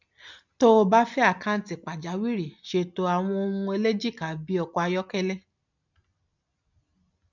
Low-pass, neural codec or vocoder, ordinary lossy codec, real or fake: 7.2 kHz; none; none; real